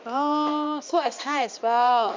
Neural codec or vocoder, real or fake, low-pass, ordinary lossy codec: codec, 16 kHz, 2 kbps, X-Codec, HuBERT features, trained on balanced general audio; fake; 7.2 kHz; MP3, 64 kbps